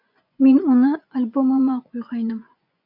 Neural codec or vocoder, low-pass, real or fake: vocoder, 24 kHz, 100 mel bands, Vocos; 5.4 kHz; fake